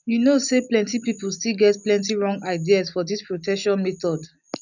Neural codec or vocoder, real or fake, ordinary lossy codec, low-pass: none; real; none; 7.2 kHz